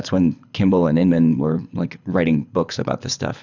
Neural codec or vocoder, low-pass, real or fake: codec, 24 kHz, 6 kbps, HILCodec; 7.2 kHz; fake